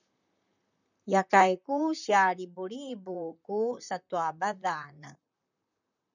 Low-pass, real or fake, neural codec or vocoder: 7.2 kHz; fake; vocoder, 44.1 kHz, 128 mel bands, Pupu-Vocoder